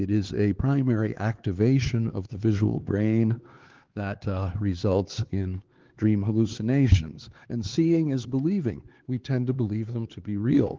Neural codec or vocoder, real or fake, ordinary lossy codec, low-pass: codec, 16 kHz, 4 kbps, X-Codec, HuBERT features, trained on LibriSpeech; fake; Opus, 16 kbps; 7.2 kHz